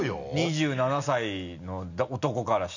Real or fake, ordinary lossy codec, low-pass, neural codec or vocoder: real; none; 7.2 kHz; none